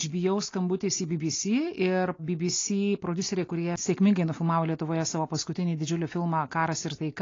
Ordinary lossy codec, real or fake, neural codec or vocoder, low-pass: AAC, 32 kbps; real; none; 7.2 kHz